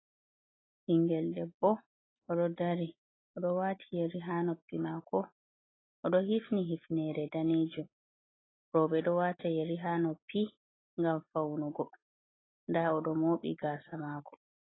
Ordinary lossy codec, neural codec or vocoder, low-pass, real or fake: AAC, 16 kbps; none; 7.2 kHz; real